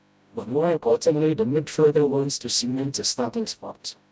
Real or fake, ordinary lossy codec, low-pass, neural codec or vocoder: fake; none; none; codec, 16 kHz, 0.5 kbps, FreqCodec, smaller model